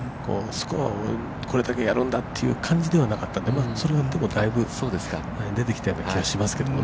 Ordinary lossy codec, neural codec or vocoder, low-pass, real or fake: none; none; none; real